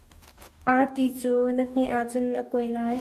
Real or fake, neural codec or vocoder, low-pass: fake; codec, 44.1 kHz, 2.6 kbps, DAC; 14.4 kHz